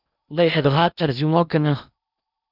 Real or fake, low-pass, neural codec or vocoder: fake; 5.4 kHz; codec, 16 kHz in and 24 kHz out, 0.8 kbps, FocalCodec, streaming, 65536 codes